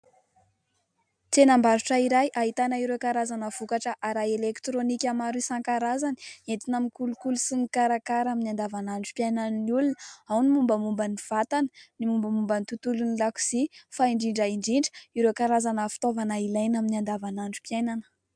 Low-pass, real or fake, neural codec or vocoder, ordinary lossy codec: 9.9 kHz; real; none; MP3, 96 kbps